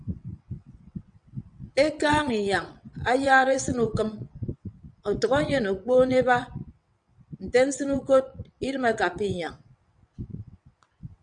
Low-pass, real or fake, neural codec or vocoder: 9.9 kHz; fake; vocoder, 22.05 kHz, 80 mel bands, WaveNeXt